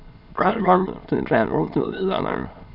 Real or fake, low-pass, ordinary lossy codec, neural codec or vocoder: fake; 5.4 kHz; none; autoencoder, 22.05 kHz, a latent of 192 numbers a frame, VITS, trained on many speakers